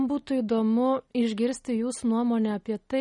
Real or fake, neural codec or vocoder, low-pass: real; none; 10.8 kHz